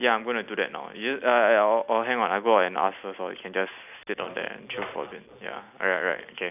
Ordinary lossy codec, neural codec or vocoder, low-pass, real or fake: none; none; 3.6 kHz; real